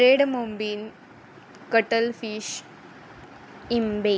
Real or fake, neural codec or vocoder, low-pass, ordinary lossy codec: real; none; none; none